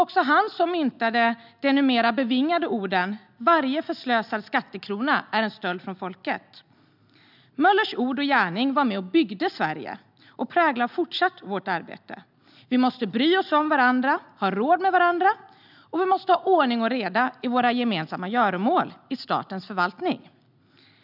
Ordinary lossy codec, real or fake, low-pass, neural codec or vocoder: none; real; 5.4 kHz; none